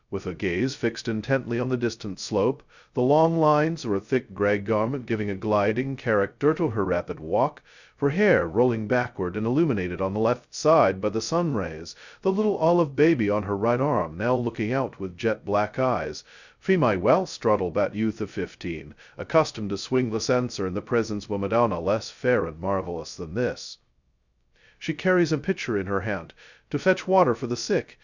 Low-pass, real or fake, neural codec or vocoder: 7.2 kHz; fake; codec, 16 kHz, 0.2 kbps, FocalCodec